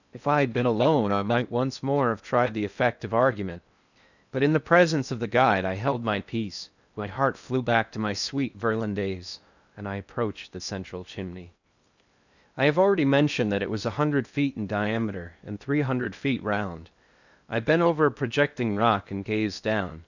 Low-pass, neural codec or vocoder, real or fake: 7.2 kHz; codec, 16 kHz in and 24 kHz out, 0.8 kbps, FocalCodec, streaming, 65536 codes; fake